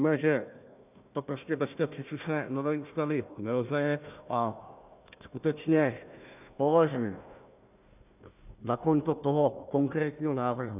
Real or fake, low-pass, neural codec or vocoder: fake; 3.6 kHz; codec, 16 kHz, 1 kbps, FunCodec, trained on Chinese and English, 50 frames a second